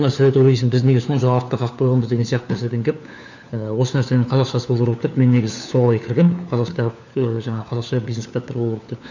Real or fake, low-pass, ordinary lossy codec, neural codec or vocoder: fake; 7.2 kHz; none; codec, 16 kHz, 4 kbps, FunCodec, trained on LibriTTS, 50 frames a second